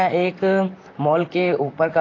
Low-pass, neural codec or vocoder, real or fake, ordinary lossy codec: 7.2 kHz; vocoder, 44.1 kHz, 128 mel bands, Pupu-Vocoder; fake; AAC, 32 kbps